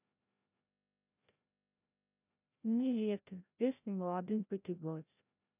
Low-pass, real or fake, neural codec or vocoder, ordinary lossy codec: 3.6 kHz; fake; codec, 16 kHz, 0.5 kbps, FreqCodec, larger model; none